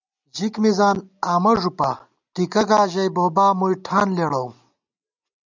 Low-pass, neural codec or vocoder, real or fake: 7.2 kHz; none; real